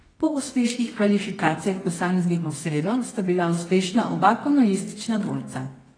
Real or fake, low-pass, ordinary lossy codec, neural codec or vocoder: fake; 9.9 kHz; AAC, 32 kbps; codec, 24 kHz, 0.9 kbps, WavTokenizer, medium music audio release